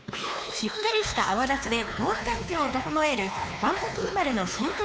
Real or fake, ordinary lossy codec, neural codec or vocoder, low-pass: fake; none; codec, 16 kHz, 2 kbps, X-Codec, WavLM features, trained on Multilingual LibriSpeech; none